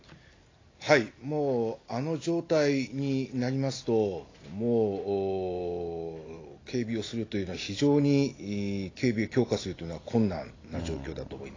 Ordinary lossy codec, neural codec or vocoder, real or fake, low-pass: AAC, 32 kbps; none; real; 7.2 kHz